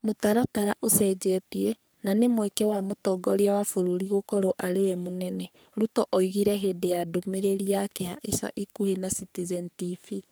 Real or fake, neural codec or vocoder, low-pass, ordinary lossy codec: fake; codec, 44.1 kHz, 3.4 kbps, Pupu-Codec; none; none